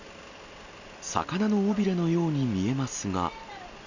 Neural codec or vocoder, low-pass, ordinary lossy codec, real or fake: none; 7.2 kHz; none; real